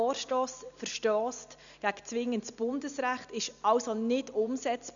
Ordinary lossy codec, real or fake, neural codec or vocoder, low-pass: none; real; none; 7.2 kHz